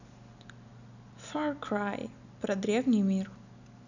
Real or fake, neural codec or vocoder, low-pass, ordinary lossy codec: real; none; 7.2 kHz; none